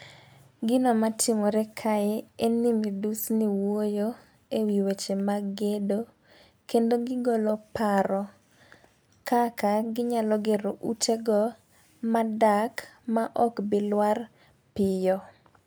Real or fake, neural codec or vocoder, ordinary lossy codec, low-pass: real; none; none; none